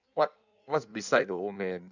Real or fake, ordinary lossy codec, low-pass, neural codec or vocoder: fake; none; 7.2 kHz; codec, 16 kHz in and 24 kHz out, 1.1 kbps, FireRedTTS-2 codec